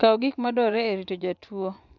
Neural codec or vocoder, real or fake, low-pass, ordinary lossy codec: vocoder, 24 kHz, 100 mel bands, Vocos; fake; 7.2 kHz; none